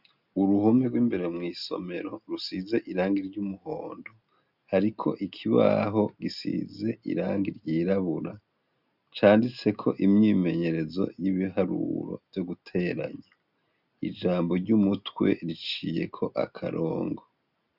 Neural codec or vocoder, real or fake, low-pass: none; real; 5.4 kHz